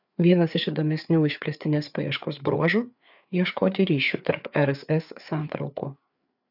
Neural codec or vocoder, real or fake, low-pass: codec, 16 kHz, 4 kbps, FreqCodec, larger model; fake; 5.4 kHz